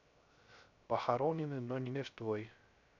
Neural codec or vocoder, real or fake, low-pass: codec, 16 kHz, 0.3 kbps, FocalCodec; fake; 7.2 kHz